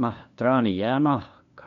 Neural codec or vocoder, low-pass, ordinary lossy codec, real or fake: codec, 16 kHz, 2 kbps, FunCodec, trained on LibriTTS, 25 frames a second; 7.2 kHz; MP3, 64 kbps; fake